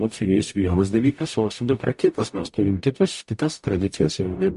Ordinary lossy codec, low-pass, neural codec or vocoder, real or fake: MP3, 48 kbps; 14.4 kHz; codec, 44.1 kHz, 0.9 kbps, DAC; fake